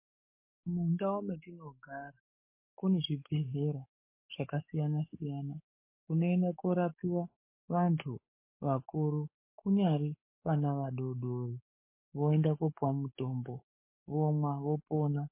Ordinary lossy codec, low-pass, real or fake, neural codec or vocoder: MP3, 24 kbps; 3.6 kHz; fake; codec, 44.1 kHz, 7.8 kbps, Pupu-Codec